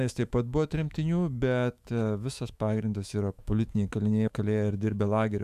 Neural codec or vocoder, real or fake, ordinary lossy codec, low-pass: autoencoder, 48 kHz, 128 numbers a frame, DAC-VAE, trained on Japanese speech; fake; AAC, 96 kbps; 14.4 kHz